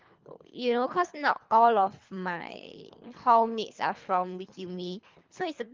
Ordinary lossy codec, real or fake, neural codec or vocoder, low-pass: Opus, 24 kbps; fake; codec, 24 kHz, 3 kbps, HILCodec; 7.2 kHz